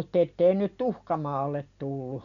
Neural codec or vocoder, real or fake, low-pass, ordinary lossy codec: none; real; 7.2 kHz; none